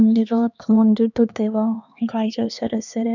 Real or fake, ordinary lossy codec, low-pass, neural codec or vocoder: fake; none; 7.2 kHz; codec, 16 kHz, 2 kbps, X-Codec, HuBERT features, trained on LibriSpeech